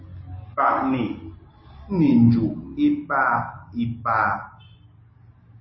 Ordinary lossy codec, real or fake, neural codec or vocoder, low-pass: MP3, 24 kbps; real; none; 7.2 kHz